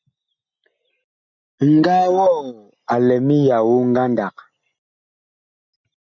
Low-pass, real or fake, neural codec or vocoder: 7.2 kHz; real; none